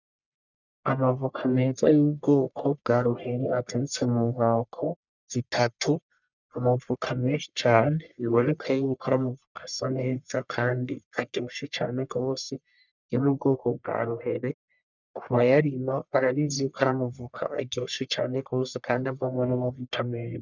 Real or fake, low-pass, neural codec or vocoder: fake; 7.2 kHz; codec, 44.1 kHz, 1.7 kbps, Pupu-Codec